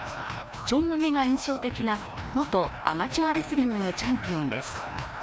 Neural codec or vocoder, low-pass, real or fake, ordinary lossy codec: codec, 16 kHz, 1 kbps, FreqCodec, larger model; none; fake; none